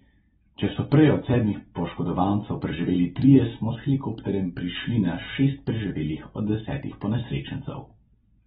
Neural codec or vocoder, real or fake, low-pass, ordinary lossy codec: none; real; 10.8 kHz; AAC, 16 kbps